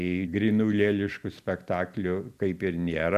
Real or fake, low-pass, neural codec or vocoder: real; 14.4 kHz; none